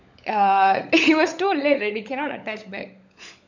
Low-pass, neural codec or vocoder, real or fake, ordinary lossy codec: 7.2 kHz; codec, 16 kHz, 16 kbps, FunCodec, trained on LibriTTS, 50 frames a second; fake; none